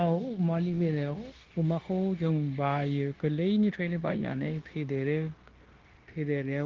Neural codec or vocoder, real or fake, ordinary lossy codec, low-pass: codec, 16 kHz, 0.9 kbps, LongCat-Audio-Codec; fake; Opus, 16 kbps; 7.2 kHz